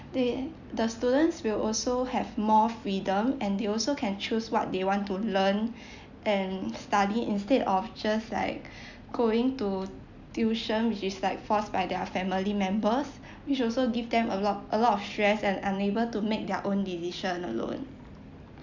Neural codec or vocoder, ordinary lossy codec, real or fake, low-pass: none; none; real; 7.2 kHz